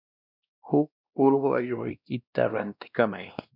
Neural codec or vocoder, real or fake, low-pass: codec, 16 kHz, 1 kbps, X-Codec, WavLM features, trained on Multilingual LibriSpeech; fake; 5.4 kHz